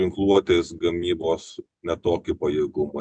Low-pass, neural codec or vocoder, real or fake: 9.9 kHz; none; real